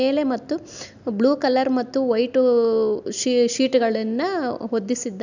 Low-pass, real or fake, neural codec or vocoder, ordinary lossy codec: 7.2 kHz; real; none; none